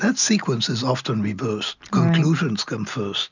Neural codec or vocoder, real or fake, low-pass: none; real; 7.2 kHz